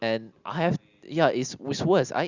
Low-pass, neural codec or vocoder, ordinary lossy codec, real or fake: 7.2 kHz; none; Opus, 64 kbps; real